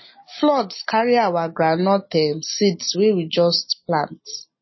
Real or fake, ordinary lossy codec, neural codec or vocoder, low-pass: real; MP3, 24 kbps; none; 7.2 kHz